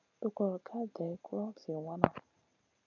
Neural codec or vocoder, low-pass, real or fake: none; 7.2 kHz; real